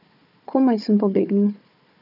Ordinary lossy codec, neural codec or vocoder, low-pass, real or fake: none; codec, 16 kHz, 4 kbps, FunCodec, trained on Chinese and English, 50 frames a second; 5.4 kHz; fake